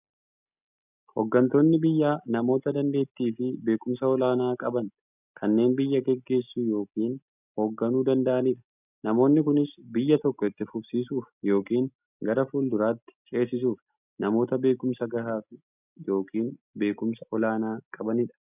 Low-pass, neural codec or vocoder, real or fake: 3.6 kHz; none; real